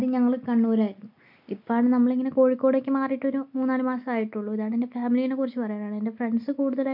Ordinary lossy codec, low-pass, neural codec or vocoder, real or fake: MP3, 48 kbps; 5.4 kHz; none; real